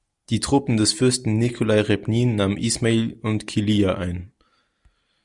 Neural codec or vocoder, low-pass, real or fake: none; 10.8 kHz; real